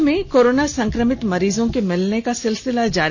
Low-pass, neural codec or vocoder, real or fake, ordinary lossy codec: none; none; real; none